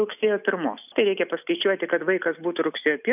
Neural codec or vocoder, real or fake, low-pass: none; real; 3.6 kHz